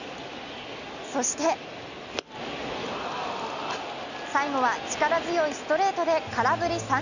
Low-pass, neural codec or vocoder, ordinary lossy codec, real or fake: 7.2 kHz; none; none; real